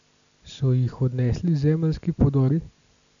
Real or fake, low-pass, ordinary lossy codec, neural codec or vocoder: real; 7.2 kHz; none; none